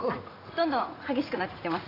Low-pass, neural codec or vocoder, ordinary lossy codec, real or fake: 5.4 kHz; vocoder, 44.1 kHz, 80 mel bands, Vocos; AAC, 24 kbps; fake